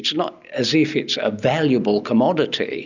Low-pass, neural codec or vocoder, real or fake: 7.2 kHz; none; real